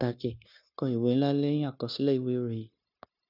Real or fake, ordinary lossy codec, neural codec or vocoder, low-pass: fake; none; codec, 16 kHz, 0.9 kbps, LongCat-Audio-Codec; 5.4 kHz